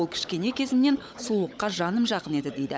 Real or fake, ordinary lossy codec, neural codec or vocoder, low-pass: fake; none; codec, 16 kHz, 16 kbps, FunCodec, trained on LibriTTS, 50 frames a second; none